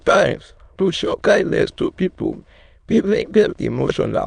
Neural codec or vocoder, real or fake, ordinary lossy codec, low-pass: autoencoder, 22.05 kHz, a latent of 192 numbers a frame, VITS, trained on many speakers; fake; none; 9.9 kHz